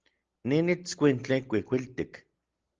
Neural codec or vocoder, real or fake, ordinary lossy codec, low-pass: none; real; Opus, 16 kbps; 7.2 kHz